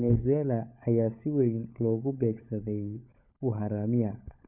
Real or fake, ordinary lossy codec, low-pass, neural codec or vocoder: fake; none; 3.6 kHz; codec, 16 kHz, 16 kbps, FunCodec, trained on LibriTTS, 50 frames a second